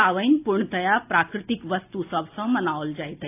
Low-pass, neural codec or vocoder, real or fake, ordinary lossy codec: 3.6 kHz; none; real; AAC, 24 kbps